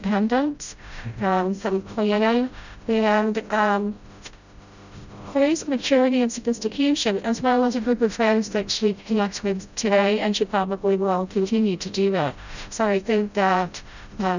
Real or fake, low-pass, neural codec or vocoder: fake; 7.2 kHz; codec, 16 kHz, 0.5 kbps, FreqCodec, smaller model